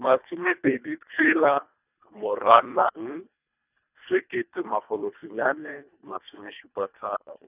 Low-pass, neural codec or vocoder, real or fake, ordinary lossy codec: 3.6 kHz; codec, 24 kHz, 1.5 kbps, HILCodec; fake; none